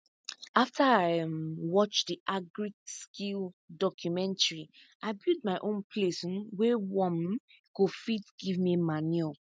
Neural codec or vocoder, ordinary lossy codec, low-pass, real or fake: none; none; none; real